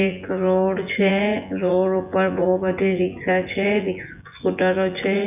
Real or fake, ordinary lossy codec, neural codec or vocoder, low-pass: fake; none; vocoder, 24 kHz, 100 mel bands, Vocos; 3.6 kHz